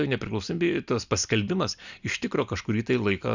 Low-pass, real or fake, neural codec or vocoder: 7.2 kHz; real; none